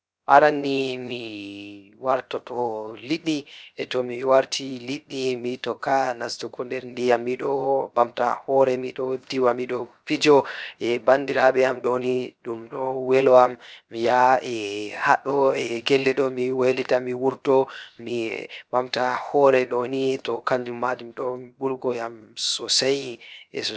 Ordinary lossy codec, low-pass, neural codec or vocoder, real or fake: none; none; codec, 16 kHz, 0.7 kbps, FocalCodec; fake